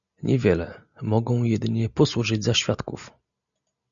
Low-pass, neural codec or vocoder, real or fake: 7.2 kHz; none; real